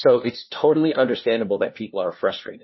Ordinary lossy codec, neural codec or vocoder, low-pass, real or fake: MP3, 24 kbps; codec, 16 kHz, 1 kbps, FunCodec, trained on LibriTTS, 50 frames a second; 7.2 kHz; fake